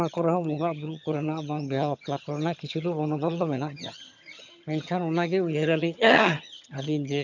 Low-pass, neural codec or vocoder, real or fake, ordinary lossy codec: 7.2 kHz; vocoder, 22.05 kHz, 80 mel bands, HiFi-GAN; fake; none